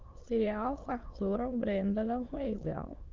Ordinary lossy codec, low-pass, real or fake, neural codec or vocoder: Opus, 16 kbps; 7.2 kHz; fake; autoencoder, 22.05 kHz, a latent of 192 numbers a frame, VITS, trained on many speakers